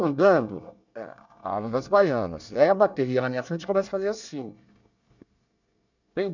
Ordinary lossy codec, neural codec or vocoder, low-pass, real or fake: none; codec, 24 kHz, 1 kbps, SNAC; 7.2 kHz; fake